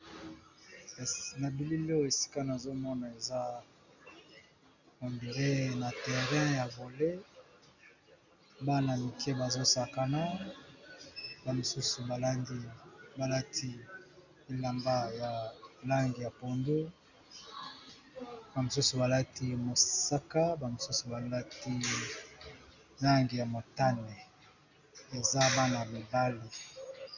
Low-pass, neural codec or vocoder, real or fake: 7.2 kHz; none; real